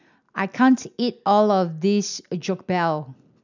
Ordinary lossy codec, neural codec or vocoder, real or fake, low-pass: none; none; real; 7.2 kHz